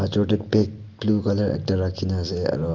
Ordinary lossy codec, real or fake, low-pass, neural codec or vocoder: Opus, 24 kbps; real; 7.2 kHz; none